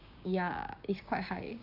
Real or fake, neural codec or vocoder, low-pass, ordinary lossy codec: fake; codec, 16 kHz, 6 kbps, DAC; 5.4 kHz; none